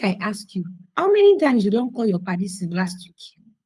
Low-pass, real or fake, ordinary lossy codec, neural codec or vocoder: none; fake; none; codec, 24 kHz, 6 kbps, HILCodec